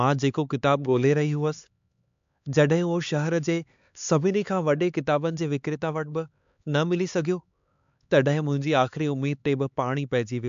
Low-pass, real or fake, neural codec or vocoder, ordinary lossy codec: 7.2 kHz; fake; codec, 16 kHz, 4 kbps, X-Codec, HuBERT features, trained on LibriSpeech; MP3, 64 kbps